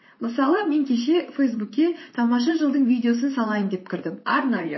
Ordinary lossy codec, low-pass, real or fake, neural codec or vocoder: MP3, 24 kbps; 7.2 kHz; fake; vocoder, 44.1 kHz, 128 mel bands, Pupu-Vocoder